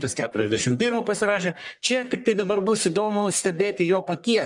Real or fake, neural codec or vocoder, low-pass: fake; codec, 44.1 kHz, 1.7 kbps, Pupu-Codec; 10.8 kHz